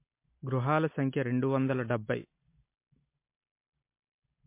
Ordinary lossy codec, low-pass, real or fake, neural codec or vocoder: MP3, 24 kbps; 3.6 kHz; real; none